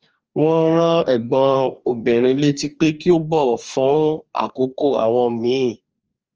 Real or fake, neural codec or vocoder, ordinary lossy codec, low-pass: fake; codec, 44.1 kHz, 2.6 kbps, DAC; Opus, 24 kbps; 7.2 kHz